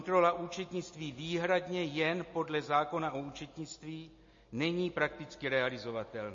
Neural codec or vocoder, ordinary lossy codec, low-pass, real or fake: none; MP3, 32 kbps; 7.2 kHz; real